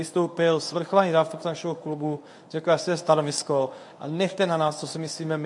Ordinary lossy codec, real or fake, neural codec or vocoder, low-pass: MP3, 64 kbps; fake; codec, 24 kHz, 0.9 kbps, WavTokenizer, medium speech release version 1; 10.8 kHz